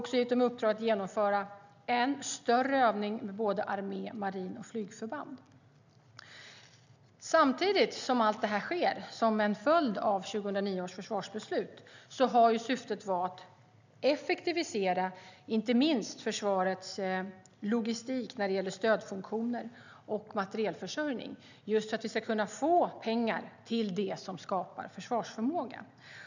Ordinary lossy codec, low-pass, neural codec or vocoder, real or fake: none; 7.2 kHz; none; real